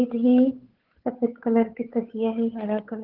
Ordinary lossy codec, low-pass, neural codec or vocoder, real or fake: Opus, 16 kbps; 5.4 kHz; codec, 16 kHz, 8 kbps, FunCodec, trained on Chinese and English, 25 frames a second; fake